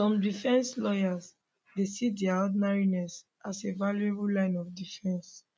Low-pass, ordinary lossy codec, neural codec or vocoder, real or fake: none; none; none; real